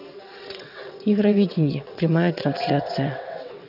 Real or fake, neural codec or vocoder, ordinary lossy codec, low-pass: fake; vocoder, 22.05 kHz, 80 mel bands, WaveNeXt; none; 5.4 kHz